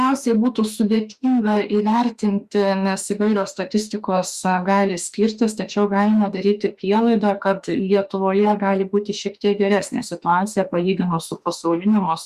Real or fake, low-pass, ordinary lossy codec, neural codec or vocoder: fake; 14.4 kHz; Opus, 64 kbps; autoencoder, 48 kHz, 32 numbers a frame, DAC-VAE, trained on Japanese speech